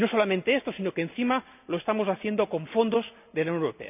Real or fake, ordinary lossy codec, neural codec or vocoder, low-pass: real; none; none; 3.6 kHz